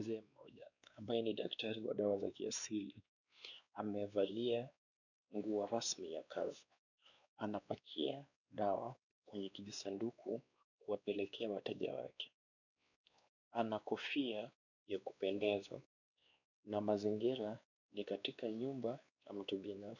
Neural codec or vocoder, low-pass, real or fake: codec, 16 kHz, 2 kbps, X-Codec, WavLM features, trained on Multilingual LibriSpeech; 7.2 kHz; fake